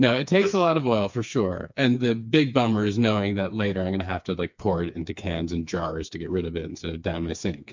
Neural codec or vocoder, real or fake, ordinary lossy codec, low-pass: codec, 16 kHz, 4 kbps, FreqCodec, smaller model; fake; MP3, 64 kbps; 7.2 kHz